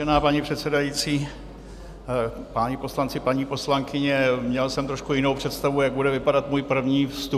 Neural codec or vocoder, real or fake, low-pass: none; real; 14.4 kHz